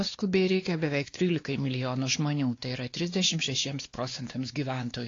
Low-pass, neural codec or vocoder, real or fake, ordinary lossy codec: 7.2 kHz; codec, 16 kHz, 2 kbps, X-Codec, WavLM features, trained on Multilingual LibriSpeech; fake; AAC, 32 kbps